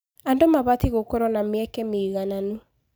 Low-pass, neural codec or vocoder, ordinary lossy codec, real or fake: none; none; none; real